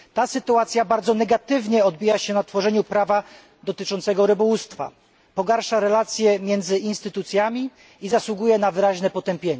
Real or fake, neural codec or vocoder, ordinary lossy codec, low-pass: real; none; none; none